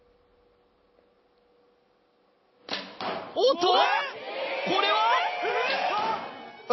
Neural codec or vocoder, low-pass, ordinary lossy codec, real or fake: none; 7.2 kHz; MP3, 24 kbps; real